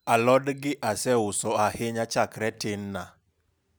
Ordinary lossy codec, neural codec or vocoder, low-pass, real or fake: none; none; none; real